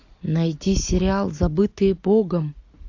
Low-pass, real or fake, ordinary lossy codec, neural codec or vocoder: 7.2 kHz; real; AAC, 48 kbps; none